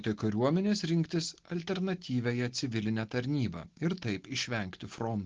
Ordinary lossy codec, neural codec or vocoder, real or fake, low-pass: Opus, 16 kbps; none; real; 7.2 kHz